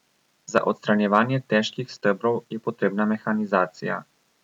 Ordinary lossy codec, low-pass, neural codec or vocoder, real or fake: none; 19.8 kHz; none; real